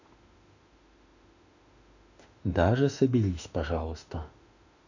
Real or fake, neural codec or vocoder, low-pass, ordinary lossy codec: fake; autoencoder, 48 kHz, 32 numbers a frame, DAC-VAE, trained on Japanese speech; 7.2 kHz; none